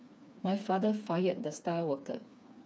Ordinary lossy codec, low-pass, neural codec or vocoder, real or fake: none; none; codec, 16 kHz, 4 kbps, FreqCodec, smaller model; fake